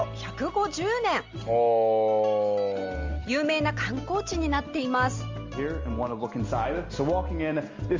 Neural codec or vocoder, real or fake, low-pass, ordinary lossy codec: none; real; 7.2 kHz; Opus, 32 kbps